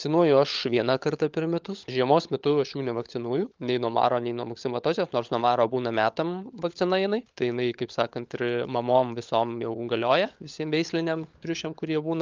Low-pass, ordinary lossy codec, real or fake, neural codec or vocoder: 7.2 kHz; Opus, 32 kbps; fake; codec, 16 kHz, 16 kbps, FunCodec, trained on LibriTTS, 50 frames a second